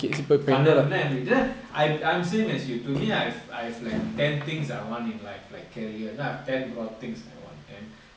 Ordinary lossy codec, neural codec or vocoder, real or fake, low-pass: none; none; real; none